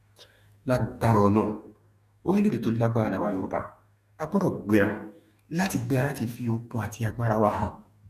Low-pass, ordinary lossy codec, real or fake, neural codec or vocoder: 14.4 kHz; none; fake; codec, 44.1 kHz, 2.6 kbps, DAC